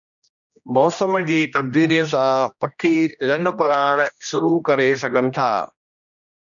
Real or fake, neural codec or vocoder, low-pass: fake; codec, 16 kHz, 1 kbps, X-Codec, HuBERT features, trained on general audio; 7.2 kHz